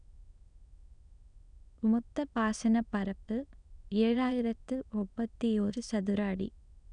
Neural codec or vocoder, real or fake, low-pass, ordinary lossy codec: autoencoder, 22.05 kHz, a latent of 192 numbers a frame, VITS, trained on many speakers; fake; 9.9 kHz; none